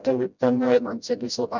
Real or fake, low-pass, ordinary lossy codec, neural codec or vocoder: fake; 7.2 kHz; none; codec, 16 kHz, 0.5 kbps, FreqCodec, smaller model